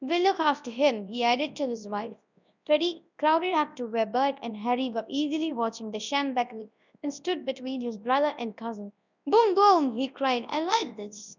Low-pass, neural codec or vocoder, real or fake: 7.2 kHz; codec, 24 kHz, 0.9 kbps, WavTokenizer, large speech release; fake